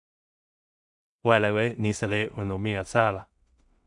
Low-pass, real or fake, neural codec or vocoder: 10.8 kHz; fake; codec, 16 kHz in and 24 kHz out, 0.4 kbps, LongCat-Audio-Codec, two codebook decoder